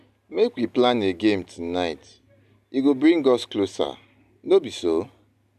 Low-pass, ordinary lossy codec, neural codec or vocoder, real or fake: 14.4 kHz; MP3, 96 kbps; none; real